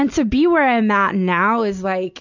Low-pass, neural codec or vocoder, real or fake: 7.2 kHz; none; real